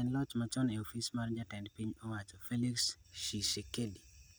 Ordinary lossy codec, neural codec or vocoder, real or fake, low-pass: none; none; real; none